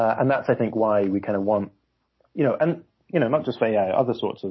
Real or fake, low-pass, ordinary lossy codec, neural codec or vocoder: real; 7.2 kHz; MP3, 24 kbps; none